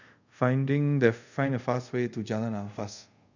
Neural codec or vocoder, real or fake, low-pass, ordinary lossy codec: codec, 24 kHz, 0.5 kbps, DualCodec; fake; 7.2 kHz; none